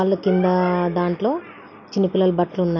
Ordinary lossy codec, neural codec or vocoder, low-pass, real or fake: none; none; 7.2 kHz; real